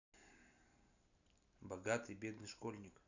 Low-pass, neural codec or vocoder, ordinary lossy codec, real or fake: 7.2 kHz; none; none; real